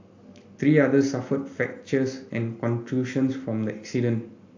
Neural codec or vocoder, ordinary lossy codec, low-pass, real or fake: none; AAC, 48 kbps; 7.2 kHz; real